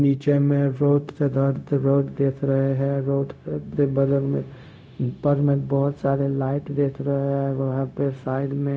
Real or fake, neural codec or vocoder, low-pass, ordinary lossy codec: fake; codec, 16 kHz, 0.4 kbps, LongCat-Audio-Codec; none; none